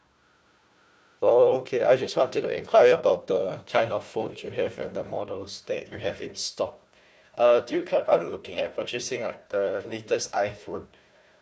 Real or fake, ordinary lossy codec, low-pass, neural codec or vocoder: fake; none; none; codec, 16 kHz, 1 kbps, FunCodec, trained on Chinese and English, 50 frames a second